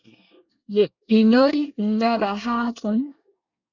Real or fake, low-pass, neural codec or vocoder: fake; 7.2 kHz; codec, 24 kHz, 1 kbps, SNAC